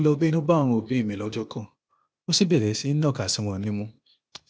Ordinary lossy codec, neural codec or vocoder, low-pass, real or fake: none; codec, 16 kHz, 0.8 kbps, ZipCodec; none; fake